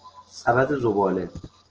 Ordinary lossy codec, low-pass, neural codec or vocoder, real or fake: Opus, 16 kbps; 7.2 kHz; none; real